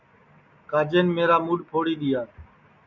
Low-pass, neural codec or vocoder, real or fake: 7.2 kHz; none; real